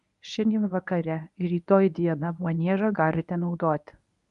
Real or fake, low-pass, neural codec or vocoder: fake; 10.8 kHz; codec, 24 kHz, 0.9 kbps, WavTokenizer, medium speech release version 1